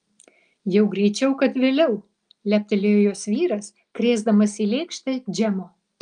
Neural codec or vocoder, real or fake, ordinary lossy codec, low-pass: none; real; Opus, 32 kbps; 9.9 kHz